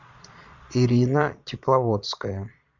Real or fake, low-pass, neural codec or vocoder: fake; 7.2 kHz; vocoder, 22.05 kHz, 80 mel bands, Vocos